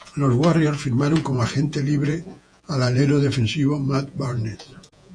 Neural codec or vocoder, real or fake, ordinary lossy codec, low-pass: vocoder, 48 kHz, 128 mel bands, Vocos; fake; AAC, 64 kbps; 9.9 kHz